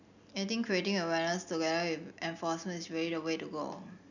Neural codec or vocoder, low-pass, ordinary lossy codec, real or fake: none; 7.2 kHz; none; real